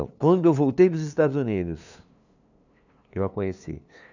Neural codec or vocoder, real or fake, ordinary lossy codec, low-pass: codec, 16 kHz, 2 kbps, FunCodec, trained on LibriTTS, 25 frames a second; fake; none; 7.2 kHz